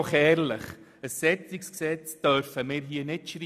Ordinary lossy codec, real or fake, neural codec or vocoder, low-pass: none; real; none; 14.4 kHz